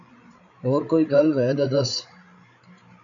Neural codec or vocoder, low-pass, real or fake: codec, 16 kHz, 8 kbps, FreqCodec, larger model; 7.2 kHz; fake